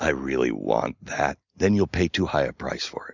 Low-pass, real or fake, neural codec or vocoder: 7.2 kHz; real; none